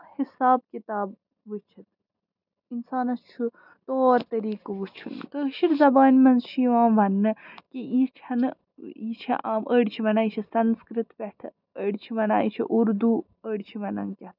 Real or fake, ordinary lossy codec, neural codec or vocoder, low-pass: real; none; none; 5.4 kHz